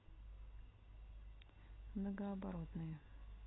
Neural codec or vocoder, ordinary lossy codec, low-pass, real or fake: none; AAC, 16 kbps; 7.2 kHz; real